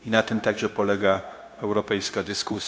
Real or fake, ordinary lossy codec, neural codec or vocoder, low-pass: fake; none; codec, 16 kHz, 0.9 kbps, LongCat-Audio-Codec; none